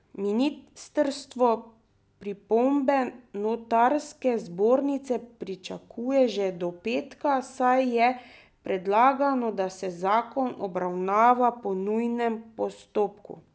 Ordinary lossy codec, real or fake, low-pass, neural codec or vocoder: none; real; none; none